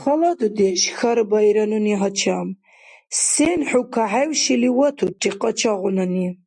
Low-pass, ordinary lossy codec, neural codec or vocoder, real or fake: 10.8 kHz; MP3, 64 kbps; none; real